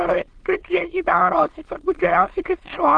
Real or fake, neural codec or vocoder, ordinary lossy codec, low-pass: fake; autoencoder, 22.05 kHz, a latent of 192 numbers a frame, VITS, trained on many speakers; Opus, 16 kbps; 9.9 kHz